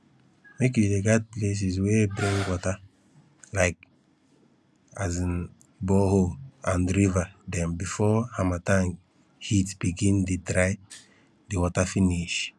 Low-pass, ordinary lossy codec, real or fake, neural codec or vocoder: none; none; real; none